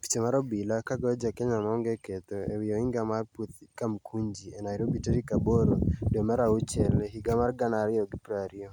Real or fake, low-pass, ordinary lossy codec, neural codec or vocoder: real; 19.8 kHz; none; none